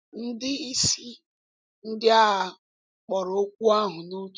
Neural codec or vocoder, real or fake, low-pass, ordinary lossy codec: none; real; none; none